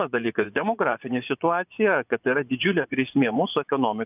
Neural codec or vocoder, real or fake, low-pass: vocoder, 24 kHz, 100 mel bands, Vocos; fake; 3.6 kHz